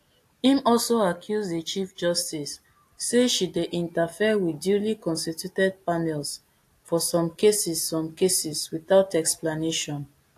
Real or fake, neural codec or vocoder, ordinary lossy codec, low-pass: real; none; AAC, 64 kbps; 14.4 kHz